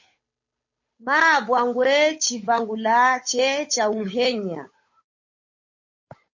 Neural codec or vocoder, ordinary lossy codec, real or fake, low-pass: codec, 16 kHz, 8 kbps, FunCodec, trained on Chinese and English, 25 frames a second; MP3, 32 kbps; fake; 7.2 kHz